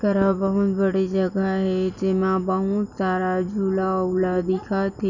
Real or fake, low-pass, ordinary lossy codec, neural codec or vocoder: real; 7.2 kHz; none; none